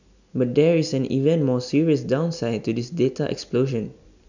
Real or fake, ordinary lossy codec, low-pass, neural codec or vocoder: real; none; 7.2 kHz; none